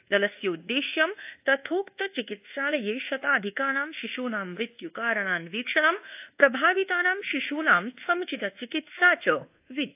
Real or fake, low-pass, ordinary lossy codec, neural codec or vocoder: fake; 3.6 kHz; AAC, 32 kbps; codec, 24 kHz, 1.2 kbps, DualCodec